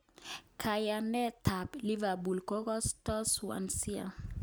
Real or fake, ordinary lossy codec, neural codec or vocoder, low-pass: real; none; none; none